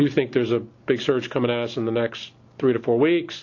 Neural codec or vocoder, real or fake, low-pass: none; real; 7.2 kHz